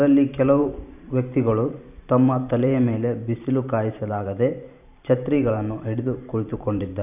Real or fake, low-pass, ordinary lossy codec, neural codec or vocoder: fake; 3.6 kHz; none; vocoder, 44.1 kHz, 128 mel bands every 512 samples, BigVGAN v2